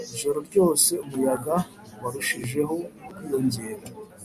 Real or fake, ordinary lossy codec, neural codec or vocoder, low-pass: real; MP3, 96 kbps; none; 14.4 kHz